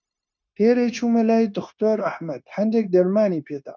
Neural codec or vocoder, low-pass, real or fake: codec, 16 kHz, 0.9 kbps, LongCat-Audio-Codec; 7.2 kHz; fake